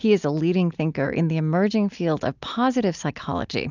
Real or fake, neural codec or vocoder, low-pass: real; none; 7.2 kHz